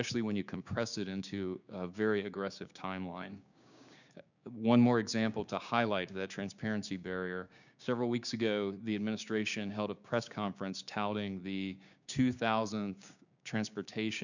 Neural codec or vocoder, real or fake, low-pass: codec, 16 kHz, 6 kbps, DAC; fake; 7.2 kHz